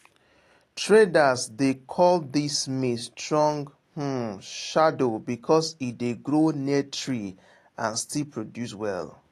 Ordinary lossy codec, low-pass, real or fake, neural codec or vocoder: AAC, 48 kbps; 14.4 kHz; real; none